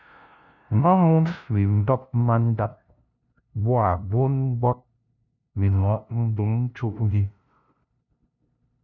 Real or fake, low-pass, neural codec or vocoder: fake; 7.2 kHz; codec, 16 kHz, 0.5 kbps, FunCodec, trained on LibriTTS, 25 frames a second